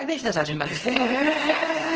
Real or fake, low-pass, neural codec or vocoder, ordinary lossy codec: fake; 7.2 kHz; codec, 24 kHz, 0.9 kbps, WavTokenizer, small release; Opus, 16 kbps